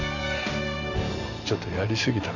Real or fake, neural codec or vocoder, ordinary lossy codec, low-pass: real; none; none; 7.2 kHz